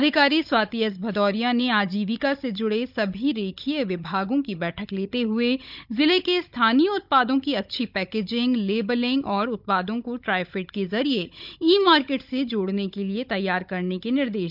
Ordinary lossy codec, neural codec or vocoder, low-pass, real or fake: none; codec, 16 kHz, 16 kbps, FunCodec, trained on Chinese and English, 50 frames a second; 5.4 kHz; fake